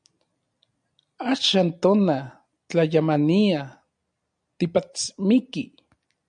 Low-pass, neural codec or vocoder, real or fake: 9.9 kHz; none; real